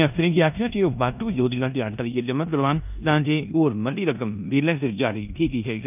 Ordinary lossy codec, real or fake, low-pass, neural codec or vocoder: none; fake; 3.6 kHz; codec, 16 kHz in and 24 kHz out, 0.9 kbps, LongCat-Audio-Codec, four codebook decoder